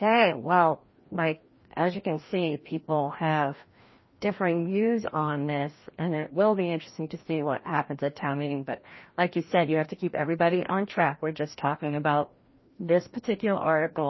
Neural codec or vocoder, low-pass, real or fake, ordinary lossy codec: codec, 16 kHz, 1 kbps, FreqCodec, larger model; 7.2 kHz; fake; MP3, 24 kbps